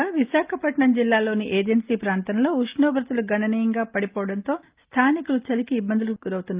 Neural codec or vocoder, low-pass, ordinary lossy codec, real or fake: none; 3.6 kHz; Opus, 32 kbps; real